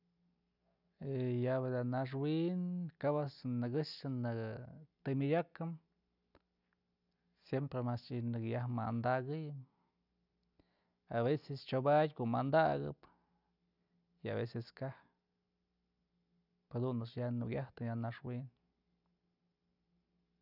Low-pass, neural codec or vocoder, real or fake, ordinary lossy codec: 5.4 kHz; none; real; none